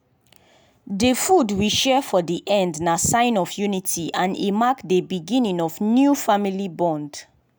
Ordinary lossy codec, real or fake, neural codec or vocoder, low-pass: none; real; none; none